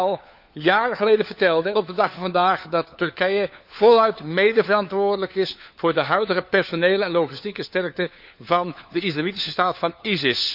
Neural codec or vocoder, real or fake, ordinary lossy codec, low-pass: codec, 16 kHz, 4 kbps, FunCodec, trained on LibriTTS, 50 frames a second; fake; none; 5.4 kHz